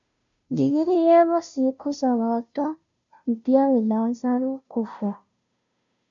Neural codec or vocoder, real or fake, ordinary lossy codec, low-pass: codec, 16 kHz, 0.5 kbps, FunCodec, trained on Chinese and English, 25 frames a second; fake; MP3, 64 kbps; 7.2 kHz